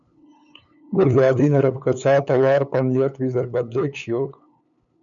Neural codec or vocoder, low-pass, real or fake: codec, 16 kHz, 8 kbps, FunCodec, trained on LibriTTS, 25 frames a second; 7.2 kHz; fake